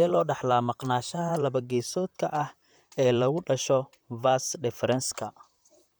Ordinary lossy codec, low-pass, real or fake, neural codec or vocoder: none; none; fake; vocoder, 44.1 kHz, 128 mel bands, Pupu-Vocoder